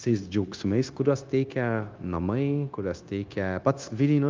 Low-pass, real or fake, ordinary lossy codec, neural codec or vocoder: 7.2 kHz; fake; Opus, 32 kbps; codec, 16 kHz, 0.9 kbps, LongCat-Audio-Codec